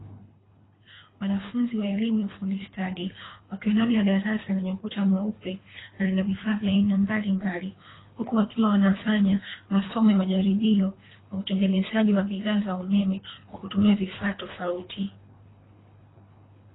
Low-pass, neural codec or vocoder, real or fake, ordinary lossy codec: 7.2 kHz; codec, 24 kHz, 3 kbps, HILCodec; fake; AAC, 16 kbps